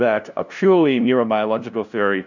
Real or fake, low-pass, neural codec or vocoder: fake; 7.2 kHz; codec, 16 kHz, 0.5 kbps, FunCodec, trained on LibriTTS, 25 frames a second